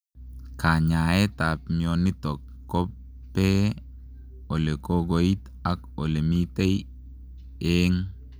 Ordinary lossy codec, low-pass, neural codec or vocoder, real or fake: none; none; none; real